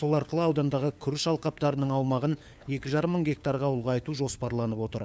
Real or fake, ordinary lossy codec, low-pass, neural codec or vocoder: fake; none; none; codec, 16 kHz, 4 kbps, FunCodec, trained on LibriTTS, 50 frames a second